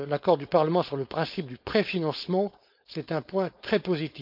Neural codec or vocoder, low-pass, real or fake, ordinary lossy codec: codec, 16 kHz, 4.8 kbps, FACodec; 5.4 kHz; fake; none